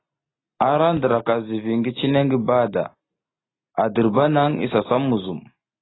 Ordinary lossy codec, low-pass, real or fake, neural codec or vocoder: AAC, 16 kbps; 7.2 kHz; real; none